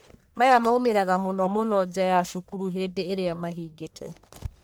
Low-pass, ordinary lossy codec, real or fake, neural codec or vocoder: none; none; fake; codec, 44.1 kHz, 1.7 kbps, Pupu-Codec